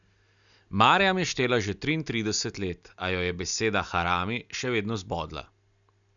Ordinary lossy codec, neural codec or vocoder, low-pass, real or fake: none; none; 7.2 kHz; real